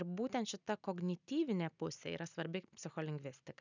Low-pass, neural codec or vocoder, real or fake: 7.2 kHz; none; real